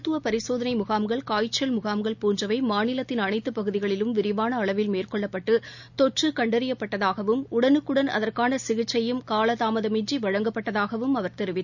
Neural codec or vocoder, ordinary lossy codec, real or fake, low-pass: none; none; real; 7.2 kHz